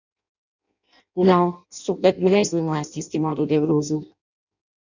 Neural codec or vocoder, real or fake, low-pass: codec, 16 kHz in and 24 kHz out, 0.6 kbps, FireRedTTS-2 codec; fake; 7.2 kHz